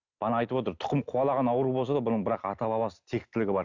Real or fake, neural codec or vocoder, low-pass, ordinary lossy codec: real; none; 7.2 kHz; none